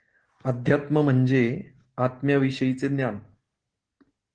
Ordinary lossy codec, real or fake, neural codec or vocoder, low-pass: Opus, 16 kbps; real; none; 9.9 kHz